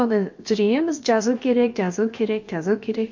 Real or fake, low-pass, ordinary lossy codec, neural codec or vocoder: fake; 7.2 kHz; MP3, 48 kbps; codec, 16 kHz, about 1 kbps, DyCAST, with the encoder's durations